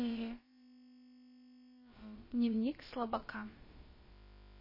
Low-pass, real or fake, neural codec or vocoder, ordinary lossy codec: 5.4 kHz; fake; codec, 16 kHz, about 1 kbps, DyCAST, with the encoder's durations; MP3, 24 kbps